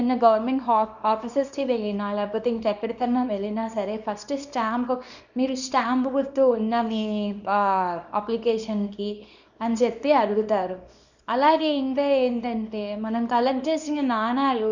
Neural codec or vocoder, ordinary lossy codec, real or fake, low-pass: codec, 24 kHz, 0.9 kbps, WavTokenizer, small release; none; fake; 7.2 kHz